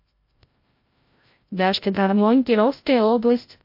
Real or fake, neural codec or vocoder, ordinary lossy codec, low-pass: fake; codec, 16 kHz, 0.5 kbps, FreqCodec, larger model; MP3, 32 kbps; 5.4 kHz